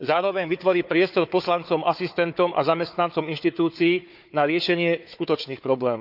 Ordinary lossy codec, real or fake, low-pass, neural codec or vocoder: none; fake; 5.4 kHz; codec, 16 kHz, 4 kbps, FunCodec, trained on Chinese and English, 50 frames a second